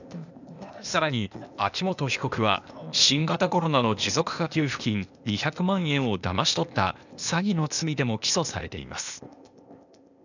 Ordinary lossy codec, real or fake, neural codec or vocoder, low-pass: none; fake; codec, 16 kHz, 0.8 kbps, ZipCodec; 7.2 kHz